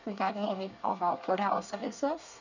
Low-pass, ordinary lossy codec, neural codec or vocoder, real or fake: 7.2 kHz; none; codec, 24 kHz, 1 kbps, SNAC; fake